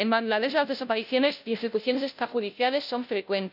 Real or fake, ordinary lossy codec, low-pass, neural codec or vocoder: fake; none; 5.4 kHz; codec, 16 kHz, 0.5 kbps, FunCodec, trained on Chinese and English, 25 frames a second